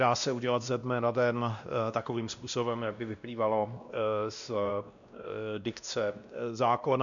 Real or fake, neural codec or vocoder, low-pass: fake; codec, 16 kHz, 1 kbps, X-Codec, WavLM features, trained on Multilingual LibriSpeech; 7.2 kHz